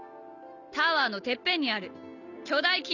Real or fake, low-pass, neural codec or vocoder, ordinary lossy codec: real; 7.2 kHz; none; none